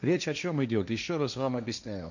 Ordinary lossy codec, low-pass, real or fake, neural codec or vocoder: none; 7.2 kHz; fake; codec, 16 kHz, 1.1 kbps, Voila-Tokenizer